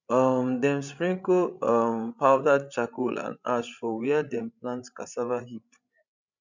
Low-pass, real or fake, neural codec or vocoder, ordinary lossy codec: 7.2 kHz; fake; codec, 16 kHz, 16 kbps, FreqCodec, larger model; none